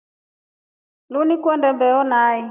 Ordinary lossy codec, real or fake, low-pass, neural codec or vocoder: AAC, 24 kbps; real; 3.6 kHz; none